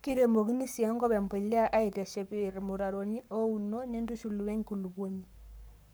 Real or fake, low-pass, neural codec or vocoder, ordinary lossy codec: fake; none; codec, 44.1 kHz, 7.8 kbps, Pupu-Codec; none